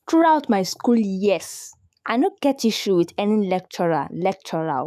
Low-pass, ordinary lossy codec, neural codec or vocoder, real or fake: 14.4 kHz; none; autoencoder, 48 kHz, 128 numbers a frame, DAC-VAE, trained on Japanese speech; fake